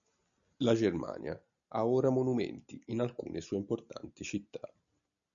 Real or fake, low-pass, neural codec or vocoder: real; 7.2 kHz; none